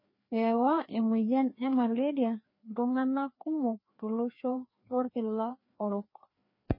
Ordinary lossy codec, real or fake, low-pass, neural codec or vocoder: MP3, 24 kbps; fake; 5.4 kHz; codec, 16 kHz, 2 kbps, FreqCodec, larger model